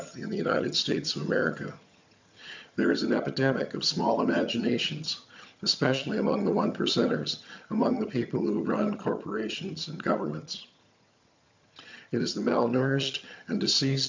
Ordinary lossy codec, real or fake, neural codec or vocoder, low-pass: MP3, 64 kbps; fake; vocoder, 22.05 kHz, 80 mel bands, HiFi-GAN; 7.2 kHz